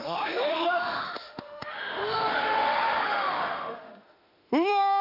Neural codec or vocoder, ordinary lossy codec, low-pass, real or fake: autoencoder, 48 kHz, 32 numbers a frame, DAC-VAE, trained on Japanese speech; none; 5.4 kHz; fake